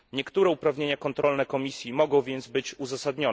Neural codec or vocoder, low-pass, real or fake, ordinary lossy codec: none; none; real; none